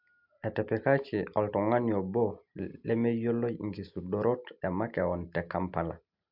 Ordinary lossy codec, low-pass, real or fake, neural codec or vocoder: MP3, 48 kbps; 5.4 kHz; real; none